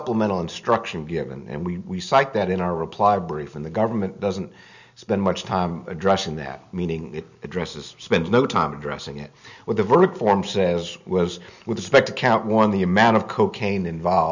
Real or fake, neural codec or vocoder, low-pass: real; none; 7.2 kHz